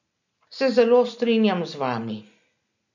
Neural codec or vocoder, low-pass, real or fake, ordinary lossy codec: none; 7.2 kHz; real; none